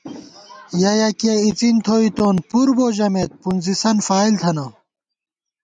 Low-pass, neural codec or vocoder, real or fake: 9.9 kHz; none; real